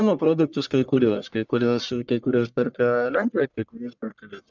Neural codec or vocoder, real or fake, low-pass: codec, 44.1 kHz, 1.7 kbps, Pupu-Codec; fake; 7.2 kHz